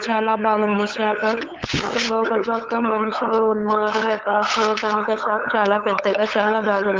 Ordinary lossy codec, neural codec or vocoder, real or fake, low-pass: Opus, 32 kbps; codec, 16 kHz, 8 kbps, FunCodec, trained on LibriTTS, 25 frames a second; fake; 7.2 kHz